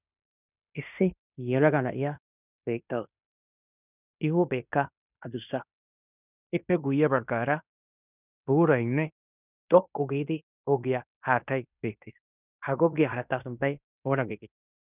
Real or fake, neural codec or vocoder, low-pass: fake; codec, 16 kHz in and 24 kHz out, 0.9 kbps, LongCat-Audio-Codec, fine tuned four codebook decoder; 3.6 kHz